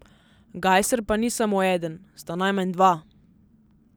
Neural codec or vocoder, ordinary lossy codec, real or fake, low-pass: none; none; real; none